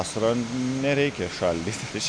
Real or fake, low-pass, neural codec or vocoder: real; 9.9 kHz; none